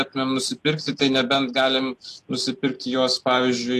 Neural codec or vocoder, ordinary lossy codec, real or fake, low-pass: none; AAC, 48 kbps; real; 14.4 kHz